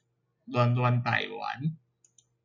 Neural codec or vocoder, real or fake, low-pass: none; real; 7.2 kHz